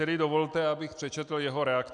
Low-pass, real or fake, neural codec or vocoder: 9.9 kHz; real; none